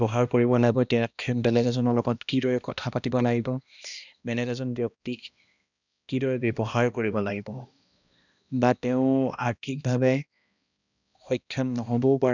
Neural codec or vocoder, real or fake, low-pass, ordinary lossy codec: codec, 16 kHz, 1 kbps, X-Codec, HuBERT features, trained on balanced general audio; fake; 7.2 kHz; none